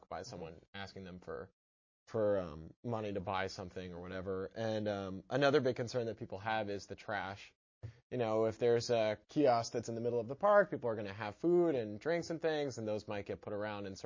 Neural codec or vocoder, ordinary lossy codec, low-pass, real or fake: none; MP3, 32 kbps; 7.2 kHz; real